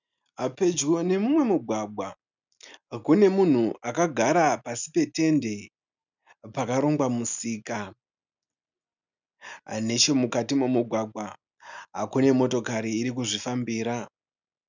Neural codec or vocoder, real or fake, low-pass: none; real; 7.2 kHz